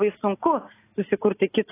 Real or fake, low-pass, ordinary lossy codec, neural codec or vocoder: real; 3.6 kHz; AAC, 16 kbps; none